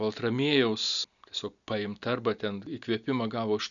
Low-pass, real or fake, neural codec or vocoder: 7.2 kHz; real; none